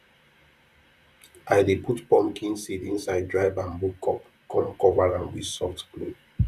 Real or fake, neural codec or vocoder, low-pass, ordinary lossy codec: fake; vocoder, 44.1 kHz, 128 mel bands, Pupu-Vocoder; 14.4 kHz; none